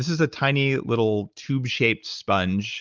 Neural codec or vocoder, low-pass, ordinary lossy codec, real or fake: none; 7.2 kHz; Opus, 24 kbps; real